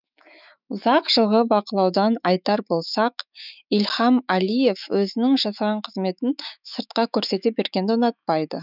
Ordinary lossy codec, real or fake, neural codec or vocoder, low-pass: none; fake; autoencoder, 48 kHz, 128 numbers a frame, DAC-VAE, trained on Japanese speech; 5.4 kHz